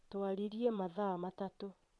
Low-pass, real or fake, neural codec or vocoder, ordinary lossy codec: none; fake; vocoder, 22.05 kHz, 80 mel bands, Vocos; none